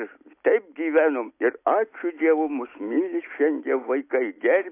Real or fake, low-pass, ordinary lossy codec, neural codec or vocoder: real; 3.6 kHz; AAC, 24 kbps; none